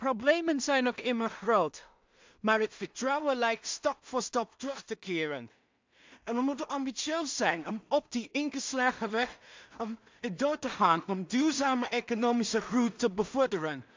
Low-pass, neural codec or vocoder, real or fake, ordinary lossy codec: 7.2 kHz; codec, 16 kHz in and 24 kHz out, 0.4 kbps, LongCat-Audio-Codec, two codebook decoder; fake; MP3, 64 kbps